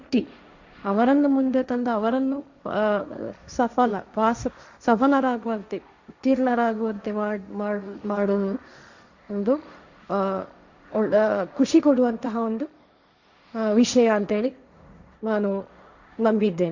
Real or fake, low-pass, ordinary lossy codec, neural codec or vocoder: fake; 7.2 kHz; Opus, 64 kbps; codec, 16 kHz, 1.1 kbps, Voila-Tokenizer